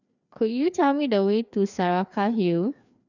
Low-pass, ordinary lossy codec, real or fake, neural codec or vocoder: 7.2 kHz; none; fake; codec, 16 kHz, 2 kbps, FreqCodec, larger model